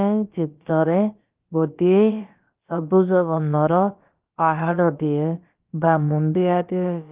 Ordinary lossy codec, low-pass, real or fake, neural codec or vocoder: Opus, 32 kbps; 3.6 kHz; fake; codec, 16 kHz, about 1 kbps, DyCAST, with the encoder's durations